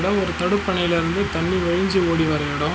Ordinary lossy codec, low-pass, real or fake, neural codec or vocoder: none; none; real; none